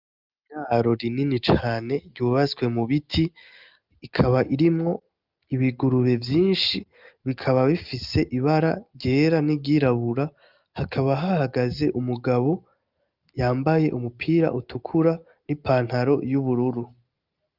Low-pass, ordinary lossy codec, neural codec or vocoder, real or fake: 5.4 kHz; Opus, 24 kbps; none; real